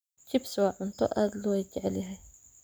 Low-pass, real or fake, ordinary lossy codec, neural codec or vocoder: none; real; none; none